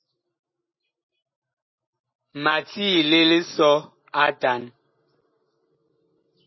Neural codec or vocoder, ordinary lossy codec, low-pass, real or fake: none; MP3, 24 kbps; 7.2 kHz; real